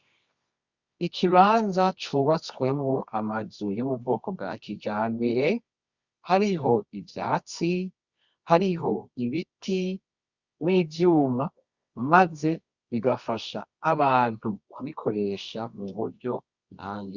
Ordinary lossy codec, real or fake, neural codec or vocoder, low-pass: Opus, 64 kbps; fake; codec, 24 kHz, 0.9 kbps, WavTokenizer, medium music audio release; 7.2 kHz